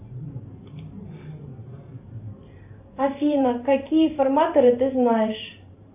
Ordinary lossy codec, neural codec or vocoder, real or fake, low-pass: AAC, 32 kbps; vocoder, 44.1 kHz, 128 mel bands every 512 samples, BigVGAN v2; fake; 3.6 kHz